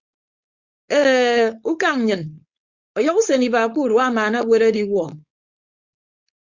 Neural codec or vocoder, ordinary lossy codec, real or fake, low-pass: codec, 16 kHz, 4.8 kbps, FACodec; Opus, 64 kbps; fake; 7.2 kHz